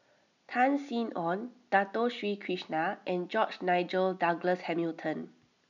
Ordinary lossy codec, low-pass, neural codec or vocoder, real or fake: none; 7.2 kHz; none; real